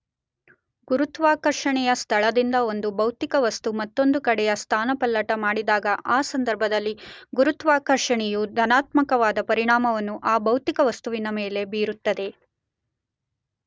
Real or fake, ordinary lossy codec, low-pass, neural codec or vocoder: real; none; none; none